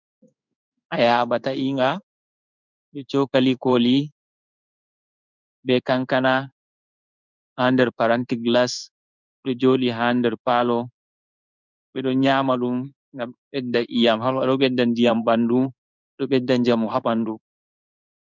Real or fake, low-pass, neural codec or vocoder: fake; 7.2 kHz; codec, 16 kHz in and 24 kHz out, 1 kbps, XY-Tokenizer